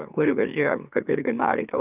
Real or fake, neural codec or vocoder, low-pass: fake; autoencoder, 44.1 kHz, a latent of 192 numbers a frame, MeloTTS; 3.6 kHz